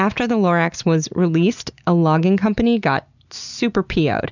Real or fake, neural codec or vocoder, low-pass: real; none; 7.2 kHz